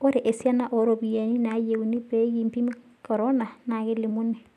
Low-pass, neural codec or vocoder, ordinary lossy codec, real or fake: 14.4 kHz; none; none; real